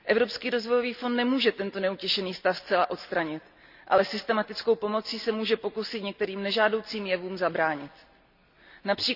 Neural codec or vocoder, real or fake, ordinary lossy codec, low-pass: none; real; none; 5.4 kHz